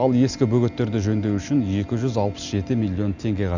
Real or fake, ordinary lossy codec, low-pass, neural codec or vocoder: real; none; 7.2 kHz; none